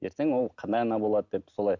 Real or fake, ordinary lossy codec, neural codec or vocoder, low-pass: real; none; none; 7.2 kHz